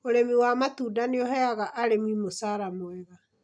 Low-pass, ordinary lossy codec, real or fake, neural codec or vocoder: 9.9 kHz; none; real; none